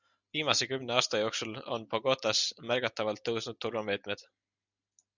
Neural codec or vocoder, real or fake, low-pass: none; real; 7.2 kHz